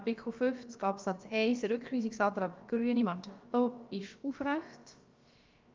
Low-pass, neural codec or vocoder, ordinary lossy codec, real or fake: 7.2 kHz; codec, 16 kHz, about 1 kbps, DyCAST, with the encoder's durations; Opus, 24 kbps; fake